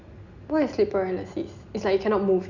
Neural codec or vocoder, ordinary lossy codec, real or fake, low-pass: none; none; real; 7.2 kHz